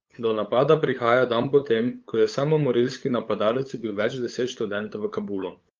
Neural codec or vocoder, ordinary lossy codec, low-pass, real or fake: codec, 16 kHz, 8 kbps, FunCodec, trained on LibriTTS, 25 frames a second; Opus, 32 kbps; 7.2 kHz; fake